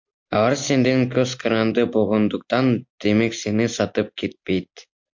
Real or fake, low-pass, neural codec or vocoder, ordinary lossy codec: real; 7.2 kHz; none; MP3, 48 kbps